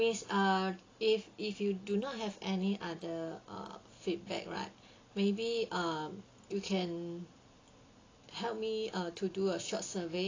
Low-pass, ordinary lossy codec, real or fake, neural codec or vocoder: 7.2 kHz; AAC, 32 kbps; real; none